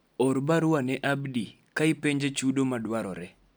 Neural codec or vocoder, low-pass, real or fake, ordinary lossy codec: none; none; real; none